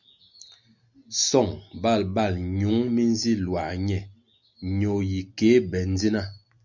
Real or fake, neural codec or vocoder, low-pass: real; none; 7.2 kHz